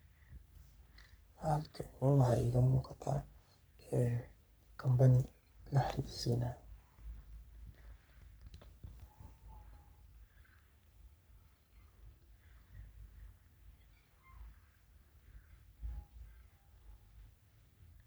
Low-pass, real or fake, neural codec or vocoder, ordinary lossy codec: none; fake; codec, 44.1 kHz, 3.4 kbps, Pupu-Codec; none